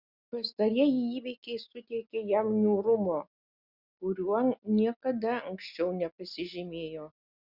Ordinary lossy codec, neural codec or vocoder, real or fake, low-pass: Opus, 64 kbps; none; real; 5.4 kHz